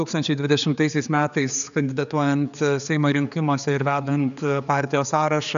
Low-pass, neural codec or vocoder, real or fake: 7.2 kHz; codec, 16 kHz, 4 kbps, X-Codec, HuBERT features, trained on general audio; fake